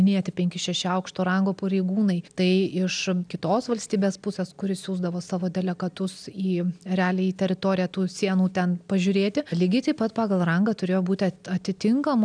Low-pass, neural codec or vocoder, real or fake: 9.9 kHz; none; real